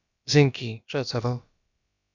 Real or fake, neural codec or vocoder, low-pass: fake; codec, 16 kHz, about 1 kbps, DyCAST, with the encoder's durations; 7.2 kHz